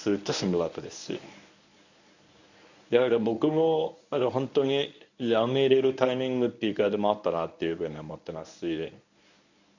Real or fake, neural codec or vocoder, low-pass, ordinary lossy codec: fake; codec, 24 kHz, 0.9 kbps, WavTokenizer, medium speech release version 1; 7.2 kHz; none